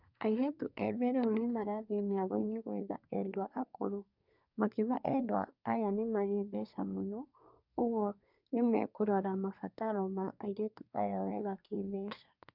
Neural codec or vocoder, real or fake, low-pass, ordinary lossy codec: codec, 24 kHz, 1 kbps, SNAC; fake; 5.4 kHz; none